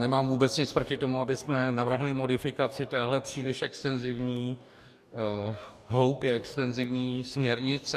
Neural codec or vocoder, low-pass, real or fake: codec, 44.1 kHz, 2.6 kbps, DAC; 14.4 kHz; fake